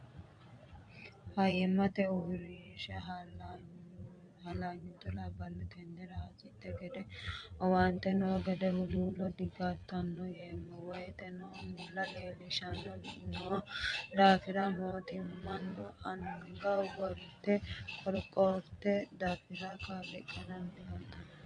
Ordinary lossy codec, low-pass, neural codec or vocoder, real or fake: MP3, 64 kbps; 9.9 kHz; vocoder, 22.05 kHz, 80 mel bands, Vocos; fake